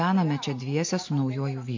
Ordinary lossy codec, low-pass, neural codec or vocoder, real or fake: MP3, 48 kbps; 7.2 kHz; none; real